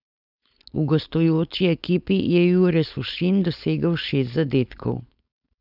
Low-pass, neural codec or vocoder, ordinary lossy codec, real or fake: 5.4 kHz; codec, 16 kHz, 4.8 kbps, FACodec; none; fake